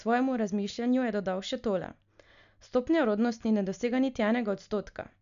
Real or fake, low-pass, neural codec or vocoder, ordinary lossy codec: real; 7.2 kHz; none; MP3, 96 kbps